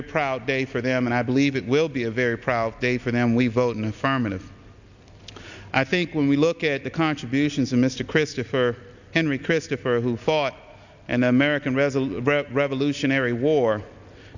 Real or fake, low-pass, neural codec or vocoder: real; 7.2 kHz; none